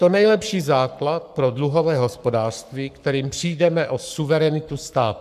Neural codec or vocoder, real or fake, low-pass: codec, 44.1 kHz, 7.8 kbps, Pupu-Codec; fake; 14.4 kHz